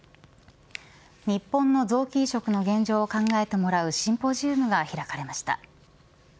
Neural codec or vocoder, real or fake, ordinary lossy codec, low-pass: none; real; none; none